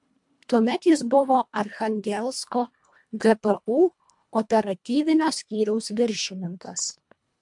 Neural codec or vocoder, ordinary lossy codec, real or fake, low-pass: codec, 24 kHz, 1.5 kbps, HILCodec; MP3, 64 kbps; fake; 10.8 kHz